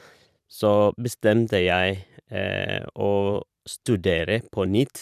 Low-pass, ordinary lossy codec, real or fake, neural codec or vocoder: 14.4 kHz; none; fake; vocoder, 44.1 kHz, 128 mel bands every 512 samples, BigVGAN v2